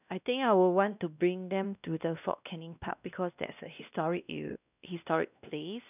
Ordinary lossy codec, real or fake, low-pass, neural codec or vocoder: none; fake; 3.6 kHz; codec, 16 kHz, 1 kbps, X-Codec, WavLM features, trained on Multilingual LibriSpeech